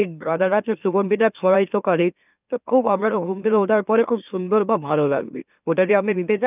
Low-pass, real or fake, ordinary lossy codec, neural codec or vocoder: 3.6 kHz; fake; none; autoencoder, 44.1 kHz, a latent of 192 numbers a frame, MeloTTS